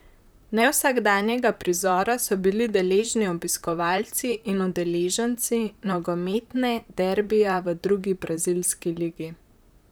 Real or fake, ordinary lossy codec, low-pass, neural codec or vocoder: fake; none; none; vocoder, 44.1 kHz, 128 mel bands, Pupu-Vocoder